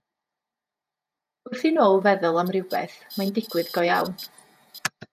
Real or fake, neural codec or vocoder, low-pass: fake; vocoder, 48 kHz, 128 mel bands, Vocos; 14.4 kHz